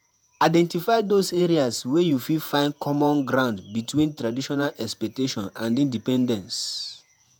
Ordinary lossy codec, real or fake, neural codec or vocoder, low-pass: none; fake; vocoder, 48 kHz, 128 mel bands, Vocos; none